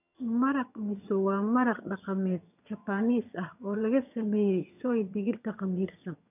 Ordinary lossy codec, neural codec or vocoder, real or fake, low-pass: none; vocoder, 22.05 kHz, 80 mel bands, HiFi-GAN; fake; 3.6 kHz